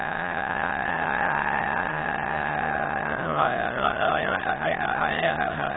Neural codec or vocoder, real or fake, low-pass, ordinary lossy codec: autoencoder, 22.05 kHz, a latent of 192 numbers a frame, VITS, trained on many speakers; fake; 7.2 kHz; AAC, 16 kbps